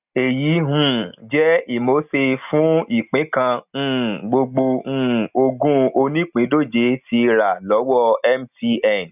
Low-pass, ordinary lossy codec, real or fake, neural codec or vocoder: 3.6 kHz; none; real; none